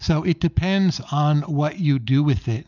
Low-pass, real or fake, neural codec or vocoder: 7.2 kHz; real; none